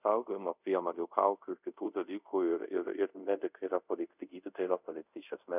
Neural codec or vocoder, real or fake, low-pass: codec, 24 kHz, 0.5 kbps, DualCodec; fake; 3.6 kHz